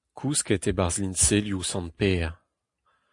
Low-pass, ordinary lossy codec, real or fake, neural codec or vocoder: 10.8 kHz; AAC, 64 kbps; real; none